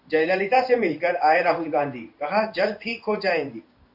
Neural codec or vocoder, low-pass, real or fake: codec, 16 kHz in and 24 kHz out, 1 kbps, XY-Tokenizer; 5.4 kHz; fake